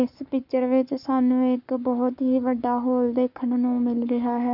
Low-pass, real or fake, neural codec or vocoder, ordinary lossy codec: 5.4 kHz; fake; codec, 44.1 kHz, 7.8 kbps, Pupu-Codec; none